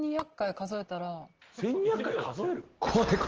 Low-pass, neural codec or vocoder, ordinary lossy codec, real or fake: 7.2 kHz; none; Opus, 16 kbps; real